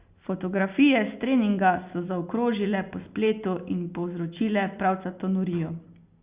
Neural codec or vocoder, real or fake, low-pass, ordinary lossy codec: none; real; 3.6 kHz; Opus, 64 kbps